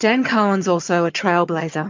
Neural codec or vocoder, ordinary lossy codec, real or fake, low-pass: vocoder, 22.05 kHz, 80 mel bands, HiFi-GAN; MP3, 48 kbps; fake; 7.2 kHz